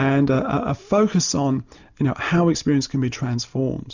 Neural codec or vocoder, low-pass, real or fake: none; 7.2 kHz; real